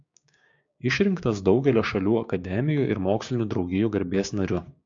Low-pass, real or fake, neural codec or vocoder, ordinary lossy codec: 7.2 kHz; fake; codec, 16 kHz, 6 kbps, DAC; AAC, 64 kbps